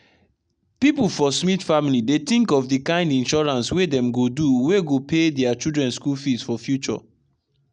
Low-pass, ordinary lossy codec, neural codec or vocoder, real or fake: 9.9 kHz; none; none; real